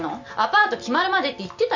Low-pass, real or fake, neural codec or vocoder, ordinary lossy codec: 7.2 kHz; real; none; none